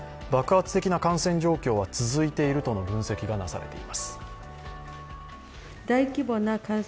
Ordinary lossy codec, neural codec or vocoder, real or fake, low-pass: none; none; real; none